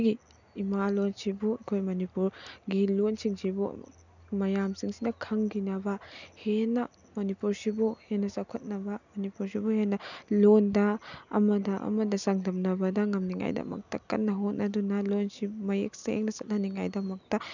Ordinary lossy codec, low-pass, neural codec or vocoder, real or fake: none; 7.2 kHz; none; real